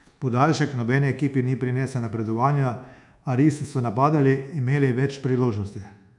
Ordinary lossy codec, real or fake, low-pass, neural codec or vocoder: none; fake; 10.8 kHz; codec, 24 kHz, 1.2 kbps, DualCodec